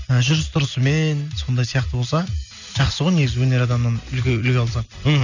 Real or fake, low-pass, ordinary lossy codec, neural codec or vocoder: real; 7.2 kHz; none; none